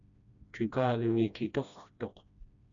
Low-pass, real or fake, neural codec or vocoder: 7.2 kHz; fake; codec, 16 kHz, 1 kbps, FreqCodec, smaller model